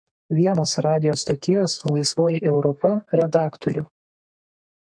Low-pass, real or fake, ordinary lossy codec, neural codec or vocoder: 9.9 kHz; fake; MP3, 64 kbps; codec, 32 kHz, 1.9 kbps, SNAC